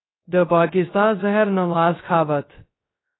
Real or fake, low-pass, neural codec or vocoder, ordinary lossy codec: fake; 7.2 kHz; codec, 16 kHz, 0.2 kbps, FocalCodec; AAC, 16 kbps